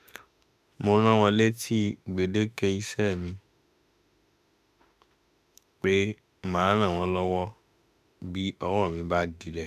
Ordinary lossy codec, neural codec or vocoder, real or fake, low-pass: none; autoencoder, 48 kHz, 32 numbers a frame, DAC-VAE, trained on Japanese speech; fake; 14.4 kHz